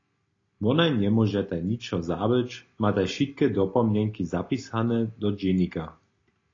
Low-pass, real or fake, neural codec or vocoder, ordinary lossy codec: 7.2 kHz; real; none; AAC, 32 kbps